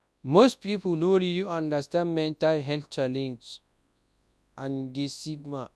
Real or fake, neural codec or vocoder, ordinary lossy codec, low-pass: fake; codec, 24 kHz, 0.9 kbps, WavTokenizer, large speech release; none; none